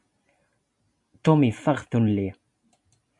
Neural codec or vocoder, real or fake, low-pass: none; real; 10.8 kHz